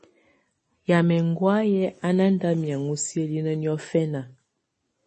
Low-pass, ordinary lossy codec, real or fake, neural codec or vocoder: 10.8 kHz; MP3, 32 kbps; real; none